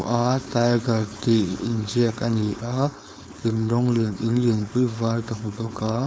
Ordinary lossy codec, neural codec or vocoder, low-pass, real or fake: none; codec, 16 kHz, 4.8 kbps, FACodec; none; fake